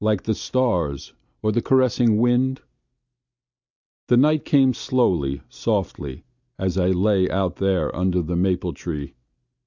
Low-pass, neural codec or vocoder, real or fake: 7.2 kHz; none; real